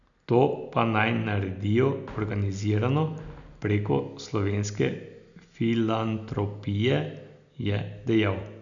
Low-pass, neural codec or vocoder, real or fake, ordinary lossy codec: 7.2 kHz; none; real; none